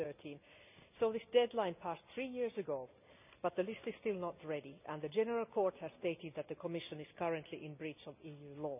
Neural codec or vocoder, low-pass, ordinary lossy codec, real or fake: none; 3.6 kHz; none; real